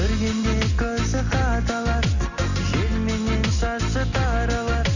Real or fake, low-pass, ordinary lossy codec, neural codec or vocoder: real; 7.2 kHz; none; none